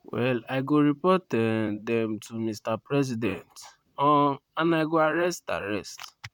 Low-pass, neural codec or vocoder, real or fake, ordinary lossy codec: 19.8 kHz; vocoder, 44.1 kHz, 128 mel bands, Pupu-Vocoder; fake; none